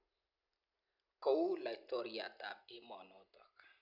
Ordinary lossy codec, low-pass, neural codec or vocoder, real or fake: none; 5.4 kHz; none; real